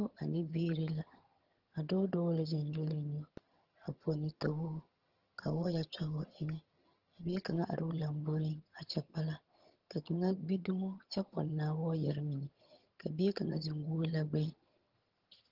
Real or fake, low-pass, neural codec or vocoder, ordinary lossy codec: fake; 5.4 kHz; vocoder, 22.05 kHz, 80 mel bands, HiFi-GAN; Opus, 16 kbps